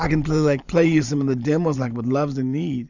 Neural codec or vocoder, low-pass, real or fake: vocoder, 44.1 kHz, 128 mel bands every 512 samples, BigVGAN v2; 7.2 kHz; fake